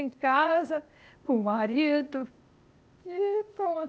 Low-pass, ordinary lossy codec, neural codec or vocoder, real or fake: none; none; codec, 16 kHz, 0.8 kbps, ZipCodec; fake